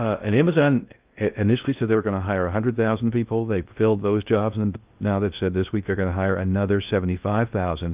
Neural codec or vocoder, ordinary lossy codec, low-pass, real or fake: codec, 16 kHz in and 24 kHz out, 0.6 kbps, FocalCodec, streaming, 2048 codes; Opus, 24 kbps; 3.6 kHz; fake